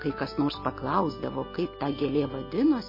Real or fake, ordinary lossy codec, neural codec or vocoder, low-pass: real; MP3, 24 kbps; none; 5.4 kHz